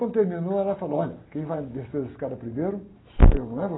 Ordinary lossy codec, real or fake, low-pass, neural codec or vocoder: AAC, 16 kbps; real; 7.2 kHz; none